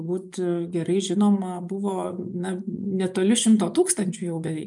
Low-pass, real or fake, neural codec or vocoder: 10.8 kHz; fake; vocoder, 24 kHz, 100 mel bands, Vocos